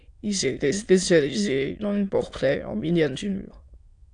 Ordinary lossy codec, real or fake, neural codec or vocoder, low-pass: AAC, 64 kbps; fake; autoencoder, 22.05 kHz, a latent of 192 numbers a frame, VITS, trained on many speakers; 9.9 kHz